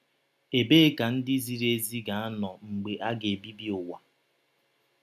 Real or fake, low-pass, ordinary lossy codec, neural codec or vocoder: real; 14.4 kHz; none; none